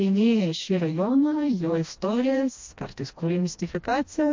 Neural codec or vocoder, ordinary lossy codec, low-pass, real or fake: codec, 16 kHz, 1 kbps, FreqCodec, smaller model; MP3, 48 kbps; 7.2 kHz; fake